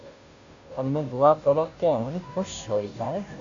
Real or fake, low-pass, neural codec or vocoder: fake; 7.2 kHz; codec, 16 kHz, 0.5 kbps, FunCodec, trained on Chinese and English, 25 frames a second